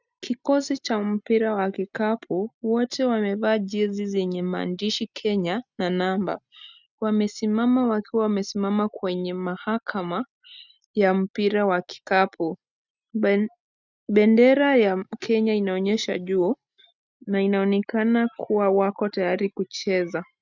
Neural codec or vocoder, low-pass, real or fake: none; 7.2 kHz; real